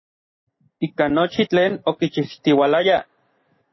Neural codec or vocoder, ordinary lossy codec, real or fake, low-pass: none; MP3, 24 kbps; real; 7.2 kHz